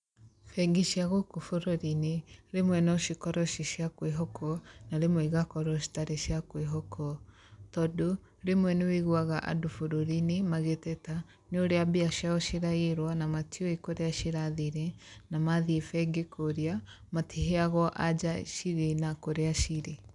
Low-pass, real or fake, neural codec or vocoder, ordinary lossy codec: 10.8 kHz; real; none; none